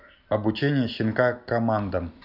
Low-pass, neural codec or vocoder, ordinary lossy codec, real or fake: 5.4 kHz; none; none; real